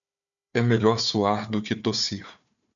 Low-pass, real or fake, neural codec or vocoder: 7.2 kHz; fake; codec, 16 kHz, 4 kbps, FunCodec, trained on Chinese and English, 50 frames a second